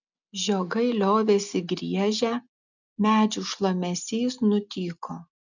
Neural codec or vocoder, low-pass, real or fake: none; 7.2 kHz; real